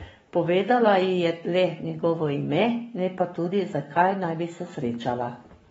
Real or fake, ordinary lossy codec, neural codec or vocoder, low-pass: fake; AAC, 24 kbps; codec, 44.1 kHz, 7.8 kbps, Pupu-Codec; 19.8 kHz